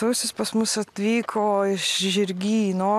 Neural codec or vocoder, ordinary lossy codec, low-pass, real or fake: none; AAC, 96 kbps; 14.4 kHz; real